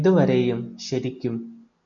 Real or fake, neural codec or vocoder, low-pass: real; none; 7.2 kHz